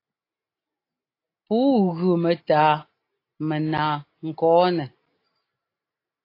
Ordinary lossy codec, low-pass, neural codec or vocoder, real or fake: AAC, 32 kbps; 5.4 kHz; none; real